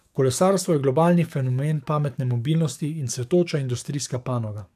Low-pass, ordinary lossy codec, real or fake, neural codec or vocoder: 14.4 kHz; none; fake; codec, 44.1 kHz, 7.8 kbps, DAC